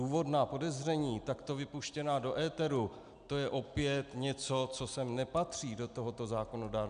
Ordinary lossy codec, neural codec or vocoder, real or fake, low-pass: MP3, 96 kbps; none; real; 9.9 kHz